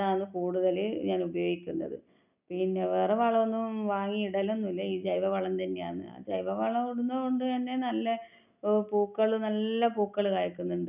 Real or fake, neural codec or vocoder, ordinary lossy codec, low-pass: real; none; none; 3.6 kHz